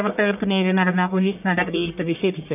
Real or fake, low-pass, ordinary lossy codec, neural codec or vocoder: fake; 3.6 kHz; none; codec, 44.1 kHz, 1.7 kbps, Pupu-Codec